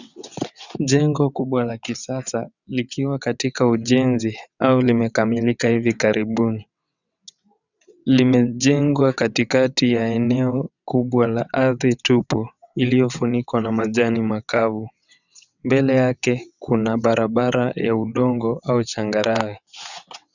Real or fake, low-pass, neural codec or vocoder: fake; 7.2 kHz; vocoder, 22.05 kHz, 80 mel bands, WaveNeXt